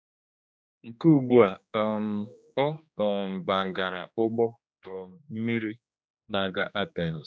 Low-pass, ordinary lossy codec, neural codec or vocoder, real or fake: none; none; codec, 16 kHz, 2 kbps, X-Codec, HuBERT features, trained on general audio; fake